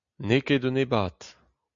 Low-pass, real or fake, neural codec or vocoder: 7.2 kHz; real; none